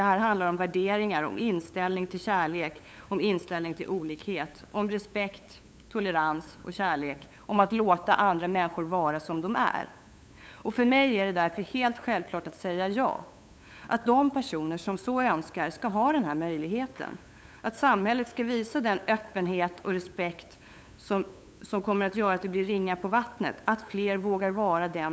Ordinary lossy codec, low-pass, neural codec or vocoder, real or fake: none; none; codec, 16 kHz, 8 kbps, FunCodec, trained on LibriTTS, 25 frames a second; fake